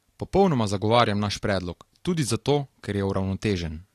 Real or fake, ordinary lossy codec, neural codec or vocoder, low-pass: real; AAC, 48 kbps; none; 14.4 kHz